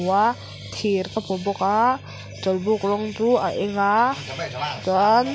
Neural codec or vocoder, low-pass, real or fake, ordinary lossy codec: none; none; real; none